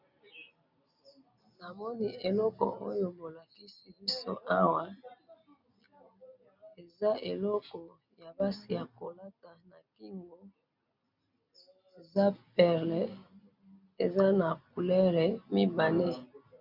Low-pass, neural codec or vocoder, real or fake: 5.4 kHz; none; real